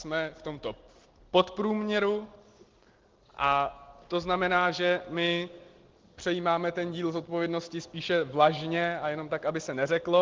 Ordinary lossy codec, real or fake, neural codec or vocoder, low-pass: Opus, 16 kbps; real; none; 7.2 kHz